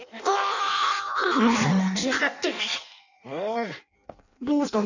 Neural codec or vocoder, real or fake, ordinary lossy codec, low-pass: codec, 16 kHz in and 24 kHz out, 0.6 kbps, FireRedTTS-2 codec; fake; none; 7.2 kHz